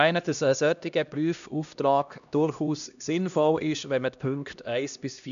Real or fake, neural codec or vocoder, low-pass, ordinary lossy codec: fake; codec, 16 kHz, 1 kbps, X-Codec, HuBERT features, trained on LibriSpeech; 7.2 kHz; none